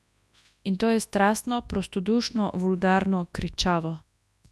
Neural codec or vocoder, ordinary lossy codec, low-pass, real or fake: codec, 24 kHz, 0.9 kbps, WavTokenizer, large speech release; none; none; fake